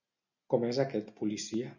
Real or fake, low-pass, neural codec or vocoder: real; 7.2 kHz; none